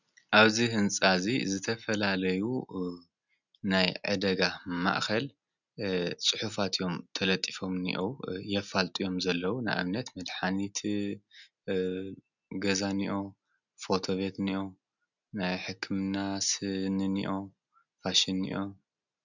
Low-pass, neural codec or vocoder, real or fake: 7.2 kHz; none; real